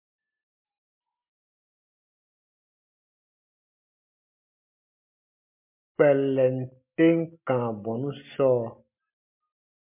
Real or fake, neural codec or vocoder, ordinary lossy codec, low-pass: real; none; MP3, 32 kbps; 3.6 kHz